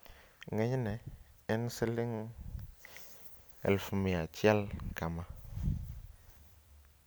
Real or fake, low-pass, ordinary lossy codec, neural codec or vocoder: real; none; none; none